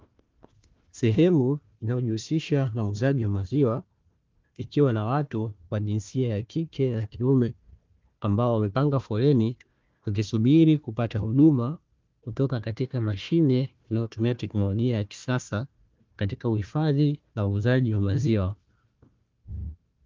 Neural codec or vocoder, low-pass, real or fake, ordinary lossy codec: codec, 16 kHz, 1 kbps, FunCodec, trained on Chinese and English, 50 frames a second; 7.2 kHz; fake; Opus, 32 kbps